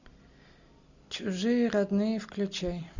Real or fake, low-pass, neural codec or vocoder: real; 7.2 kHz; none